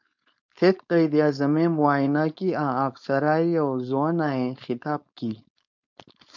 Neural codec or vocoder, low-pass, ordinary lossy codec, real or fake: codec, 16 kHz, 4.8 kbps, FACodec; 7.2 kHz; MP3, 64 kbps; fake